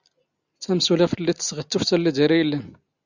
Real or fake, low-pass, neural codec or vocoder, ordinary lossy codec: real; 7.2 kHz; none; Opus, 64 kbps